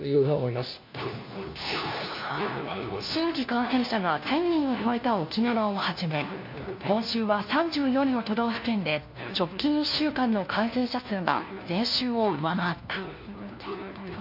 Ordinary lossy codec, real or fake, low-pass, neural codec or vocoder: MP3, 32 kbps; fake; 5.4 kHz; codec, 16 kHz, 1 kbps, FunCodec, trained on LibriTTS, 50 frames a second